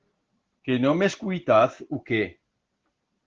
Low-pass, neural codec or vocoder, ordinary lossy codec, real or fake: 7.2 kHz; none; Opus, 16 kbps; real